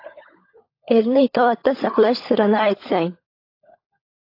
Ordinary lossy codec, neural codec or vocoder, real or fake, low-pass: AAC, 32 kbps; codec, 16 kHz, 16 kbps, FunCodec, trained on LibriTTS, 50 frames a second; fake; 5.4 kHz